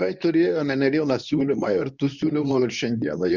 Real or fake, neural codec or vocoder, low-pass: fake; codec, 24 kHz, 0.9 kbps, WavTokenizer, medium speech release version 2; 7.2 kHz